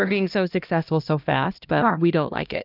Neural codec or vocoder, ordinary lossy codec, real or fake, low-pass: codec, 16 kHz, 1 kbps, X-Codec, HuBERT features, trained on LibriSpeech; Opus, 24 kbps; fake; 5.4 kHz